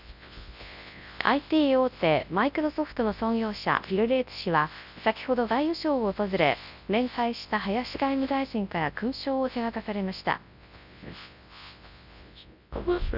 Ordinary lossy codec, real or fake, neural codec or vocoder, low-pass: none; fake; codec, 24 kHz, 0.9 kbps, WavTokenizer, large speech release; 5.4 kHz